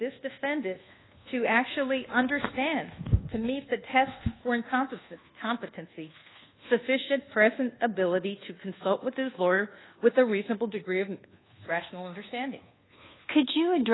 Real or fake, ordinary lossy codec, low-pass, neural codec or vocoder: fake; AAC, 16 kbps; 7.2 kHz; codec, 24 kHz, 1.2 kbps, DualCodec